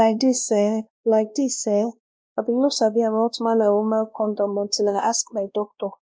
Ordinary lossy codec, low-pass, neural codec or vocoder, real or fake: none; none; codec, 16 kHz, 1 kbps, X-Codec, WavLM features, trained on Multilingual LibriSpeech; fake